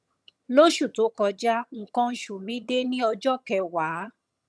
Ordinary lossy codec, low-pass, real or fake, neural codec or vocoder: none; none; fake; vocoder, 22.05 kHz, 80 mel bands, HiFi-GAN